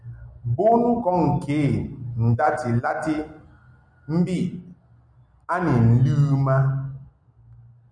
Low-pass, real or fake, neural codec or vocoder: 9.9 kHz; real; none